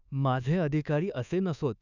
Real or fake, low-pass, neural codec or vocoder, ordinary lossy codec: fake; 7.2 kHz; codec, 24 kHz, 1.2 kbps, DualCodec; none